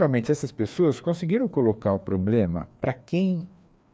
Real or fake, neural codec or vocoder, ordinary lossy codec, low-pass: fake; codec, 16 kHz, 2 kbps, FreqCodec, larger model; none; none